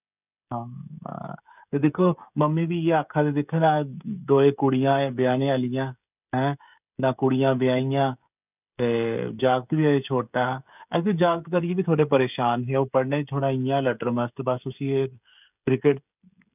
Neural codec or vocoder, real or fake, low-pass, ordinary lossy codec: codec, 16 kHz, 16 kbps, FreqCodec, smaller model; fake; 3.6 kHz; none